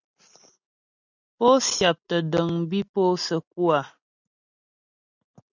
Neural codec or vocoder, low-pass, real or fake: none; 7.2 kHz; real